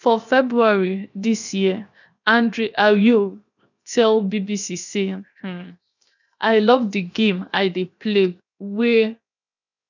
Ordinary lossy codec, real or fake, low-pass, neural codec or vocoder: none; fake; 7.2 kHz; codec, 16 kHz, 0.7 kbps, FocalCodec